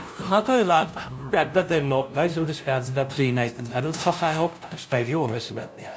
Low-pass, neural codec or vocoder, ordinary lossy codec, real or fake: none; codec, 16 kHz, 0.5 kbps, FunCodec, trained on LibriTTS, 25 frames a second; none; fake